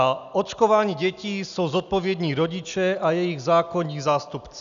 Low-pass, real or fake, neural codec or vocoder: 7.2 kHz; real; none